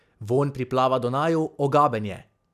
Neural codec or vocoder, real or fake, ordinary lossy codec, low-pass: none; real; none; 14.4 kHz